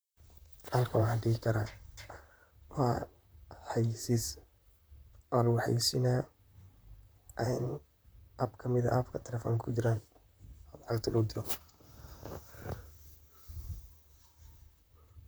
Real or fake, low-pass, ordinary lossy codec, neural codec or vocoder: fake; none; none; vocoder, 44.1 kHz, 128 mel bands, Pupu-Vocoder